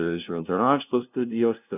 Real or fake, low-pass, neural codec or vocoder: fake; 3.6 kHz; codec, 16 kHz, 0.5 kbps, FunCodec, trained on LibriTTS, 25 frames a second